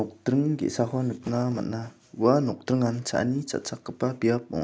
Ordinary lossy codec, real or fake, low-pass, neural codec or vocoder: none; real; none; none